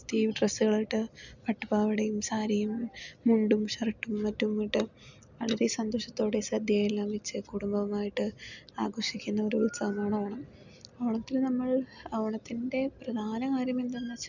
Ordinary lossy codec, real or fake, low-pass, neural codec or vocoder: none; real; 7.2 kHz; none